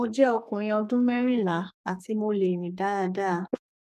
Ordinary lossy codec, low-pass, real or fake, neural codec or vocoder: none; 14.4 kHz; fake; codec, 32 kHz, 1.9 kbps, SNAC